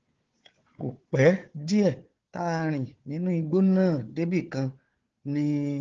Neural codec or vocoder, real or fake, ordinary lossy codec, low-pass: codec, 16 kHz, 4 kbps, FunCodec, trained on Chinese and English, 50 frames a second; fake; Opus, 16 kbps; 7.2 kHz